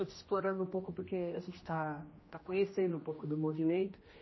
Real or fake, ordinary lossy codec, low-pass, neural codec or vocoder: fake; MP3, 24 kbps; 7.2 kHz; codec, 16 kHz, 1 kbps, X-Codec, HuBERT features, trained on general audio